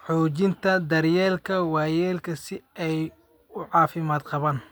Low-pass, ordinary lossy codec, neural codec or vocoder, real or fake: none; none; none; real